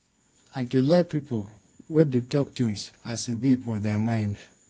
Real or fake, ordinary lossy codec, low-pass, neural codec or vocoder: fake; AAC, 48 kbps; 10.8 kHz; codec, 24 kHz, 0.9 kbps, WavTokenizer, medium music audio release